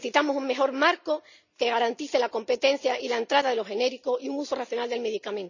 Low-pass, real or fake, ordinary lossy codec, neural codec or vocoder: 7.2 kHz; real; none; none